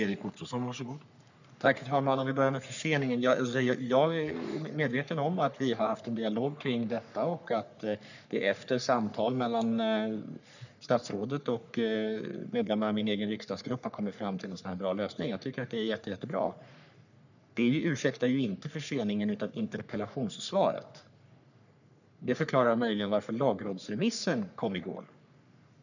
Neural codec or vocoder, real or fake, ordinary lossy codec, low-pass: codec, 44.1 kHz, 3.4 kbps, Pupu-Codec; fake; none; 7.2 kHz